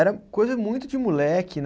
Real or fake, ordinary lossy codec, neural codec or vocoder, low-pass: real; none; none; none